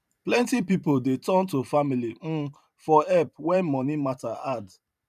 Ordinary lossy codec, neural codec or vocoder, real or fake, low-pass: none; vocoder, 44.1 kHz, 128 mel bands every 512 samples, BigVGAN v2; fake; 14.4 kHz